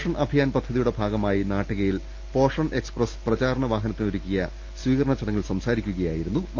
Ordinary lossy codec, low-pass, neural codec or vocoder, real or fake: Opus, 32 kbps; 7.2 kHz; none; real